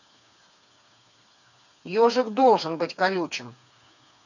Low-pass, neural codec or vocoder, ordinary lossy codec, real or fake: 7.2 kHz; codec, 16 kHz, 4 kbps, FreqCodec, smaller model; none; fake